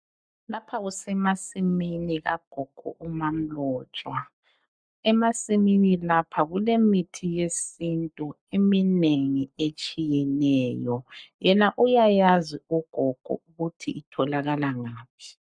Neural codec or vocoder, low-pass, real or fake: codec, 44.1 kHz, 7.8 kbps, Pupu-Codec; 9.9 kHz; fake